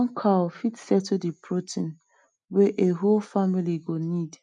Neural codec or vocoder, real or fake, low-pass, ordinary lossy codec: none; real; 9.9 kHz; none